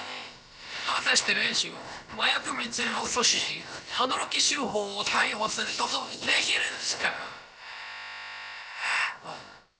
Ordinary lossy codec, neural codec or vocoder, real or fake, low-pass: none; codec, 16 kHz, about 1 kbps, DyCAST, with the encoder's durations; fake; none